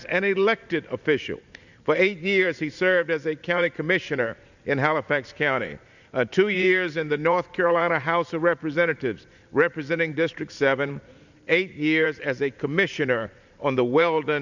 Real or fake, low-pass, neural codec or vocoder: fake; 7.2 kHz; vocoder, 44.1 kHz, 128 mel bands every 512 samples, BigVGAN v2